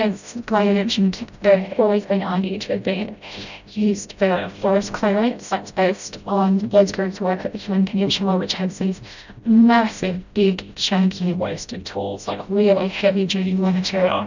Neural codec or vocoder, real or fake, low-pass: codec, 16 kHz, 0.5 kbps, FreqCodec, smaller model; fake; 7.2 kHz